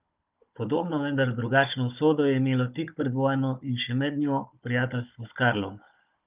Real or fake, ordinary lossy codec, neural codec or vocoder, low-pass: fake; Opus, 24 kbps; codec, 16 kHz, 16 kbps, FunCodec, trained on Chinese and English, 50 frames a second; 3.6 kHz